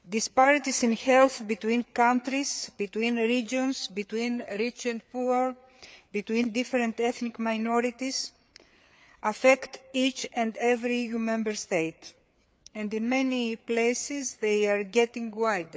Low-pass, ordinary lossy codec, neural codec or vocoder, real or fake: none; none; codec, 16 kHz, 4 kbps, FreqCodec, larger model; fake